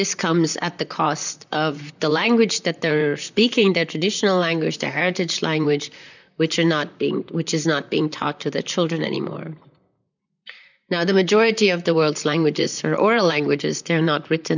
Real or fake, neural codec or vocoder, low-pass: fake; vocoder, 44.1 kHz, 128 mel bands, Pupu-Vocoder; 7.2 kHz